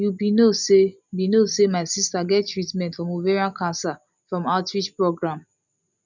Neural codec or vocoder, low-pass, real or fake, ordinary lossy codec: none; 7.2 kHz; real; none